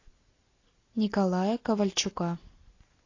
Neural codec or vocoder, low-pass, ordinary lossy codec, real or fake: none; 7.2 kHz; AAC, 32 kbps; real